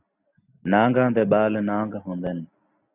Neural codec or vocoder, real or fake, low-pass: none; real; 3.6 kHz